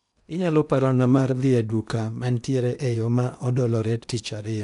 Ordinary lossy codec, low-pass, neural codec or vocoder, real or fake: none; 10.8 kHz; codec, 16 kHz in and 24 kHz out, 0.8 kbps, FocalCodec, streaming, 65536 codes; fake